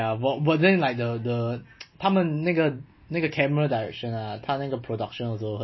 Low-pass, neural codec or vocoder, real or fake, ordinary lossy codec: 7.2 kHz; none; real; MP3, 24 kbps